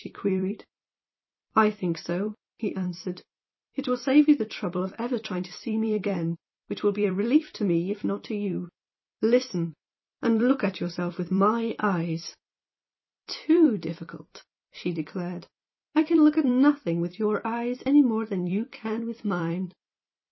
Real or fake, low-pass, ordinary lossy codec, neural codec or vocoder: fake; 7.2 kHz; MP3, 24 kbps; vocoder, 44.1 kHz, 128 mel bands every 256 samples, BigVGAN v2